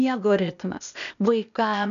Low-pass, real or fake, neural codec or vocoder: 7.2 kHz; fake; codec, 16 kHz, 0.8 kbps, ZipCodec